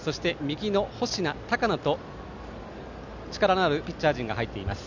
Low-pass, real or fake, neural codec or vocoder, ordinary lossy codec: 7.2 kHz; real; none; none